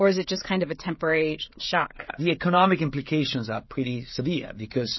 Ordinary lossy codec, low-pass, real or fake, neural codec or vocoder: MP3, 24 kbps; 7.2 kHz; fake; codec, 16 kHz, 16 kbps, FreqCodec, smaller model